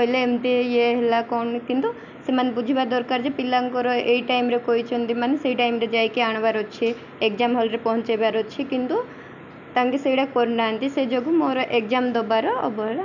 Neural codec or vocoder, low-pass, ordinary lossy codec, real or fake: none; 7.2 kHz; none; real